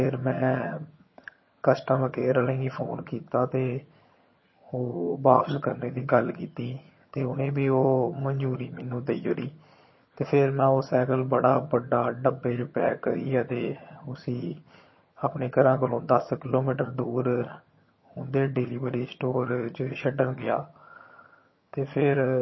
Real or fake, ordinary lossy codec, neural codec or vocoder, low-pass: fake; MP3, 24 kbps; vocoder, 22.05 kHz, 80 mel bands, HiFi-GAN; 7.2 kHz